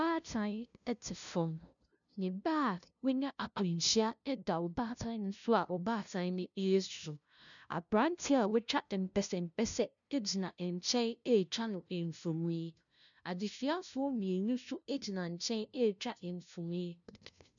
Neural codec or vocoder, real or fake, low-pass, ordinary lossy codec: codec, 16 kHz, 0.5 kbps, FunCodec, trained on LibriTTS, 25 frames a second; fake; 7.2 kHz; MP3, 96 kbps